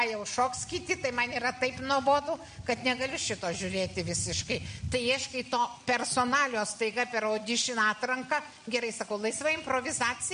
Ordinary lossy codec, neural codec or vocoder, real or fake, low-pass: MP3, 48 kbps; none; real; 9.9 kHz